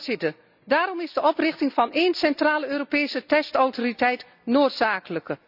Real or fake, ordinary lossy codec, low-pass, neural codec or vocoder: real; none; 5.4 kHz; none